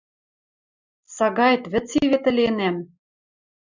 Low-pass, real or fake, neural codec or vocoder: 7.2 kHz; real; none